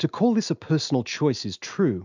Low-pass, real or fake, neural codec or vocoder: 7.2 kHz; real; none